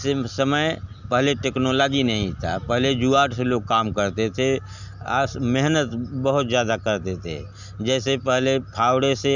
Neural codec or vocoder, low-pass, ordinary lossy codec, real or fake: none; 7.2 kHz; none; real